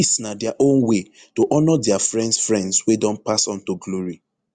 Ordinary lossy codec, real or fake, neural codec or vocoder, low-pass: Opus, 64 kbps; real; none; 9.9 kHz